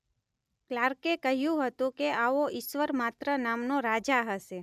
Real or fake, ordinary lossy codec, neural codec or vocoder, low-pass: real; none; none; 14.4 kHz